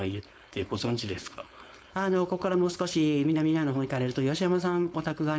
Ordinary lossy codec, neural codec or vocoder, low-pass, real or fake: none; codec, 16 kHz, 4.8 kbps, FACodec; none; fake